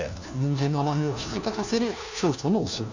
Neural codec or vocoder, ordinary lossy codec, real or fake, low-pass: codec, 16 kHz, 1 kbps, FunCodec, trained on LibriTTS, 50 frames a second; none; fake; 7.2 kHz